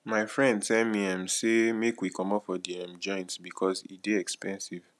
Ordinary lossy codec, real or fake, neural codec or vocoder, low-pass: none; real; none; none